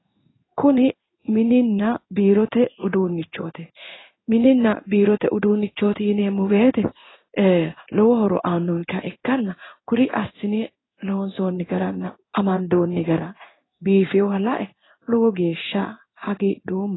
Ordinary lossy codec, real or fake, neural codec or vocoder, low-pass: AAC, 16 kbps; fake; codec, 16 kHz in and 24 kHz out, 1 kbps, XY-Tokenizer; 7.2 kHz